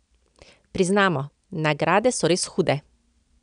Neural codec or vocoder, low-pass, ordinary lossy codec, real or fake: none; 9.9 kHz; none; real